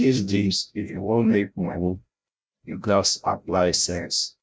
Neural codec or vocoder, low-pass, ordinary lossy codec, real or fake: codec, 16 kHz, 0.5 kbps, FreqCodec, larger model; none; none; fake